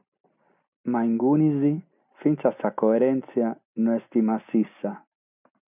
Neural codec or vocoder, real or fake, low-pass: none; real; 3.6 kHz